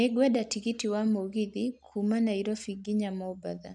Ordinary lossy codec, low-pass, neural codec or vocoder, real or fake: none; 10.8 kHz; none; real